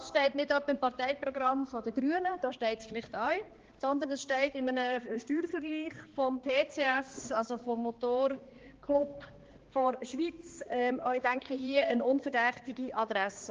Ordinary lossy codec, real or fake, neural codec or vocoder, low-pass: Opus, 16 kbps; fake; codec, 16 kHz, 2 kbps, X-Codec, HuBERT features, trained on balanced general audio; 7.2 kHz